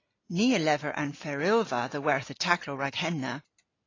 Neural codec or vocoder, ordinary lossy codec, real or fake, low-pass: none; AAC, 32 kbps; real; 7.2 kHz